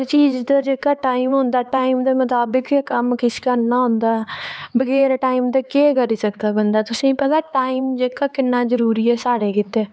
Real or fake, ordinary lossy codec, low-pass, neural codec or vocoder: fake; none; none; codec, 16 kHz, 4 kbps, X-Codec, HuBERT features, trained on LibriSpeech